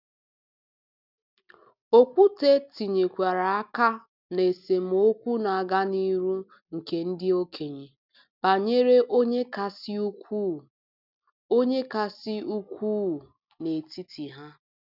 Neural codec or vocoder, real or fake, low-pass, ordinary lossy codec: none; real; 5.4 kHz; none